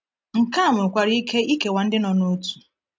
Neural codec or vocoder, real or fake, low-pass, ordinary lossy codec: none; real; none; none